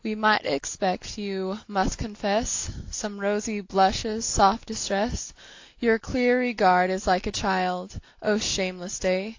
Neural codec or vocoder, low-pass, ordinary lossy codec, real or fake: none; 7.2 kHz; AAC, 48 kbps; real